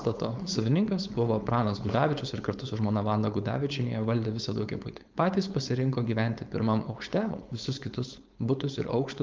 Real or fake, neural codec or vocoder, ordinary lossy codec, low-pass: fake; codec, 16 kHz, 4.8 kbps, FACodec; Opus, 32 kbps; 7.2 kHz